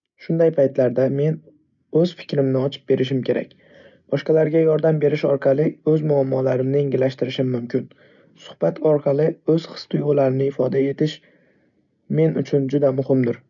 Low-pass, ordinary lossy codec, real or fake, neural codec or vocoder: 7.2 kHz; none; real; none